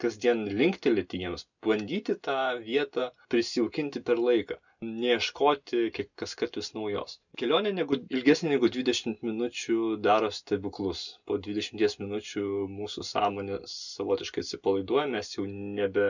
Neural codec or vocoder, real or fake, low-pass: none; real; 7.2 kHz